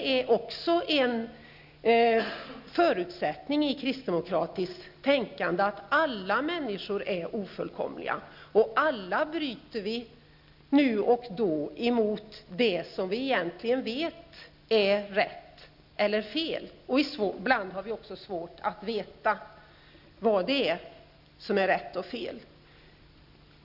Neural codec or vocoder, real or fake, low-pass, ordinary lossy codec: none; real; 5.4 kHz; none